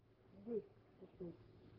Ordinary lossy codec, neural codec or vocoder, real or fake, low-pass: Opus, 16 kbps; codec, 44.1 kHz, 3.4 kbps, Pupu-Codec; fake; 5.4 kHz